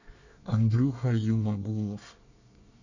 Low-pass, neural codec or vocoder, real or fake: 7.2 kHz; codec, 24 kHz, 1 kbps, SNAC; fake